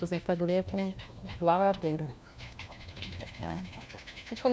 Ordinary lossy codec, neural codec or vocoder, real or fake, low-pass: none; codec, 16 kHz, 1 kbps, FunCodec, trained on LibriTTS, 50 frames a second; fake; none